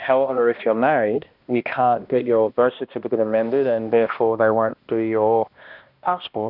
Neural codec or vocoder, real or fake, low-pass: codec, 16 kHz, 1 kbps, X-Codec, HuBERT features, trained on balanced general audio; fake; 5.4 kHz